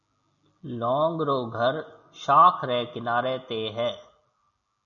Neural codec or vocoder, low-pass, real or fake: none; 7.2 kHz; real